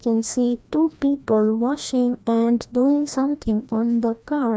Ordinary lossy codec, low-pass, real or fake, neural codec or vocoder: none; none; fake; codec, 16 kHz, 1 kbps, FreqCodec, larger model